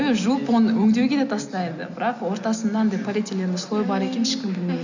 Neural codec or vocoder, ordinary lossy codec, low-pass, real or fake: none; none; 7.2 kHz; real